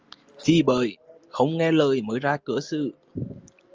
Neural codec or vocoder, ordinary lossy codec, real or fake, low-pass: none; Opus, 24 kbps; real; 7.2 kHz